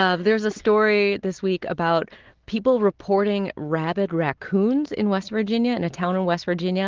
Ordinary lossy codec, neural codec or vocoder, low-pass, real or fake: Opus, 16 kbps; none; 7.2 kHz; real